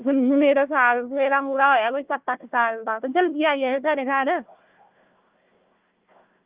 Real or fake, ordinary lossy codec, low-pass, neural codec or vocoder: fake; Opus, 32 kbps; 3.6 kHz; codec, 16 kHz, 1 kbps, FunCodec, trained on Chinese and English, 50 frames a second